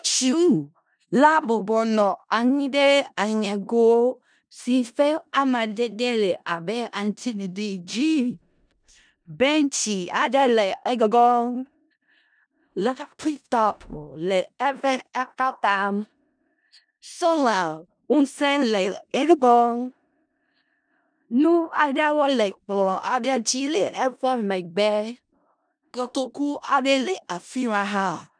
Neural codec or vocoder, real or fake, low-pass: codec, 16 kHz in and 24 kHz out, 0.4 kbps, LongCat-Audio-Codec, four codebook decoder; fake; 9.9 kHz